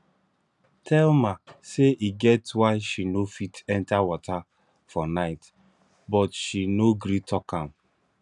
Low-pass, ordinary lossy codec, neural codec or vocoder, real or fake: 10.8 kHz; none; none; real